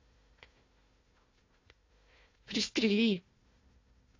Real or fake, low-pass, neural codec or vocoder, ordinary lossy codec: fake; 7.2 kHz; codec, 16 kHz, 1 kbps, FunCodec, trained on Chinese and English, 50 frames a second; AAC, 32 kbps